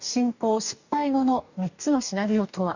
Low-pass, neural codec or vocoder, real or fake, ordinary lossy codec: 7.2 kHz; codec, 44.1 kHz, 2.6 kbps, DAC; fake; none